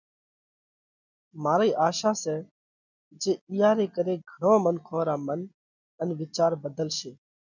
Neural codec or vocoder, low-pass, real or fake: none; 7.2 kHz; real